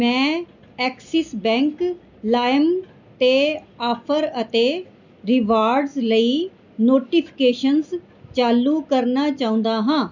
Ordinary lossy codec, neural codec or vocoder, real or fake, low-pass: none; none; real; 7.2 kHz